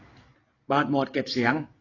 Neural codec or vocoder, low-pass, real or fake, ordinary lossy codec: none; 7.2 kHz; real; AAC, 32 kbps